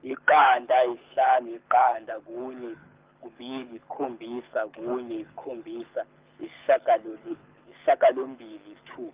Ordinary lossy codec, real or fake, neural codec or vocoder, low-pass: Opus, 32 kbps; fake; codec, 24 kHz, 6 kbps, HILCodec; 3.6 kHz